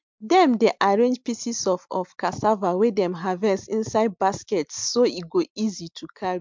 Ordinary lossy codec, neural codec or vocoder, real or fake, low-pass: MP3, 64 kbps; none; real; 7.2 kHz